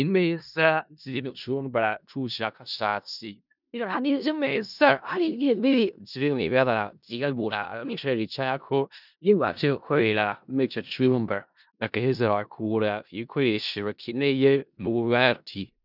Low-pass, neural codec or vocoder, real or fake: 5.4 kHz; codec, 16 kHz in and 24 kHz out, 0.4 kbps, LongCat-Audio-Codec, four codebook decoder; fake